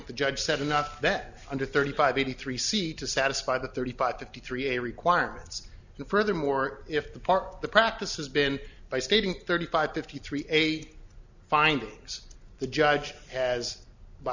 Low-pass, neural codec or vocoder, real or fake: 7.2 kHz; none; real